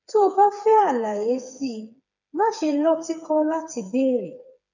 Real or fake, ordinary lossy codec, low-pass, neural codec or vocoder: fake; none; 7.2 kHz; codec, 16 kHz, 4 kbps, FreqCodec, smaller model